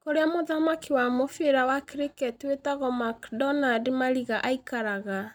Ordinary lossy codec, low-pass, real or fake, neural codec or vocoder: none; none; real; none